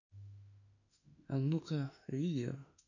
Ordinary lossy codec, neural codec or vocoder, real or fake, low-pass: none; autoencoder, 48 kHz, 32 numbers a frame, DAC-VAE, trained on Japanese speech; fake; 7.2 kHz